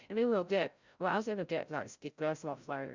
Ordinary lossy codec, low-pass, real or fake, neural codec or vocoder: Opus, 64 kbps; 7.2 kHz; fake; codec, 16 kHz, 0.5 kbps, FreqCodec, larger model